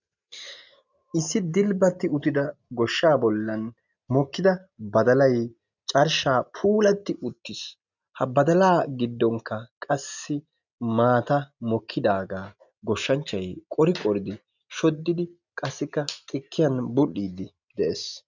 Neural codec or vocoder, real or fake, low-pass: none; real; 7.2 kHz